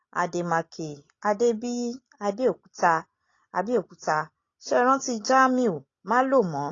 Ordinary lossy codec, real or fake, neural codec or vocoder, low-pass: AAC, 32 kbps; real; none; 7.2 kHz